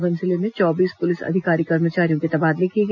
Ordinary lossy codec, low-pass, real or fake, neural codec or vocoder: none; 7.2 kHz; real; none